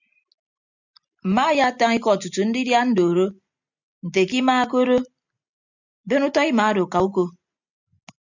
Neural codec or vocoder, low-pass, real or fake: none; 7.2 kHz; real